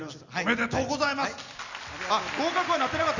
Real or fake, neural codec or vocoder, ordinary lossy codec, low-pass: real; none; none; 7.2 kHz